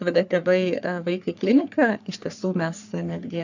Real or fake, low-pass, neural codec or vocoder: fake; 7.2 kHz; codec, 44.1 kHz, 3.4 kbps, Pupu-Codec